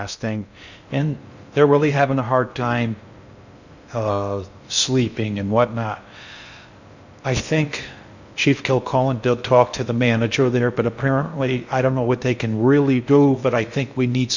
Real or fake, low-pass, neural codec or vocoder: fake; 7.2 kHz; codec, 16 kHz in and 24 kHz out, 0.6 kbps, FocalCodec, streaming, 2048 codes